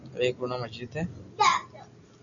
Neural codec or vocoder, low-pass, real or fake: none; 7.2 kHz; real